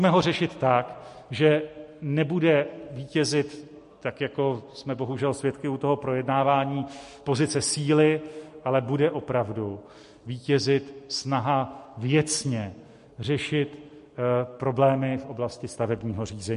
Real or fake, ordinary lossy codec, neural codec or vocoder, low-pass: real; MP3, 48 kbps; none; 10.8 kHz